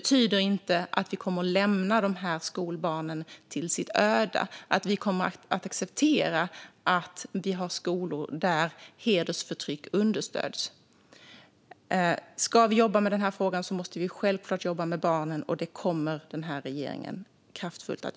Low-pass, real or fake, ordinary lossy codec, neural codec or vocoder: none; real; none; none